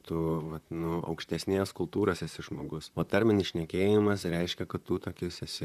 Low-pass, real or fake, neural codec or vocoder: 14.4 kHz; fake; vocoder, 44.1 kHz, 128 mel bands, Pupu-Vocoder